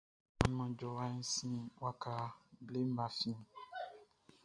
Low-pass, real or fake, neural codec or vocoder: 9.9 kHz; real; none